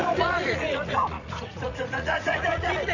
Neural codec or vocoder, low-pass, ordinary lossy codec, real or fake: vocoder, 44.1 kHz, 80 mel bands, Vocos; 7.2 kHz; none; fake